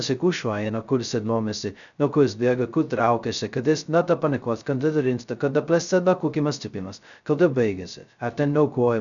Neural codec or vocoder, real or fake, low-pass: codec, 16 kHz, 0.2 kbps, FocalCodec; fake; 7.2 kHz